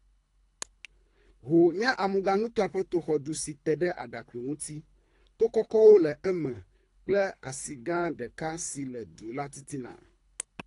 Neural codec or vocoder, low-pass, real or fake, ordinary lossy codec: codec, 24 kHz, 3 kbps, HILCodec; 10.8 kHz; fake; AAC, 48 kbps